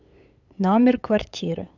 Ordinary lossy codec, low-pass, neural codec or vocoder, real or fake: none; 7.2 kHz; codec, 16 kHz, 8 kbps, FunCodec, trained on LibriTTS, 25 frames a second; fake